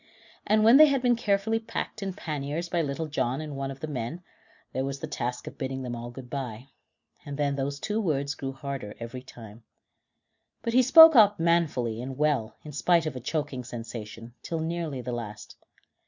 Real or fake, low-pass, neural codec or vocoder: real; 7.2 kHz; none